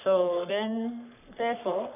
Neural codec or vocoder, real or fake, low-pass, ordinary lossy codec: codec, 44.1 kHz, 3.4 kbps, Pupu-Codec; fake; 3.6 kHz; none